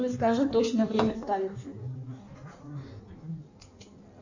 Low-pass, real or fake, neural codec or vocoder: 7.2 kHz; fake; codec, 16 kHz in and 24 kHz out, 1.1 kbps, FireRedTTS-2 codec